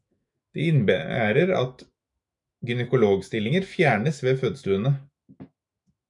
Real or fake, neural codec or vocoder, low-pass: fake; autoencoder, 48 kHz, 128 numbers a frame, DAC-VAE, trained on Japanese speech; 10.8 kHz